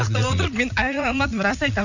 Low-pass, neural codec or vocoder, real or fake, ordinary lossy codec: 7.2 kHz; vocoder, 44.1 kHz, 80 mel bands, Vocos; fake; none